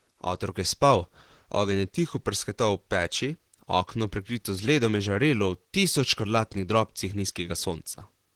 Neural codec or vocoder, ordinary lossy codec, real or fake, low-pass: vocoder, 44.1 kHz, 128 mel bands, Pupu-Vocoder; Opus, 16 kbps; fake; 19.8 kHz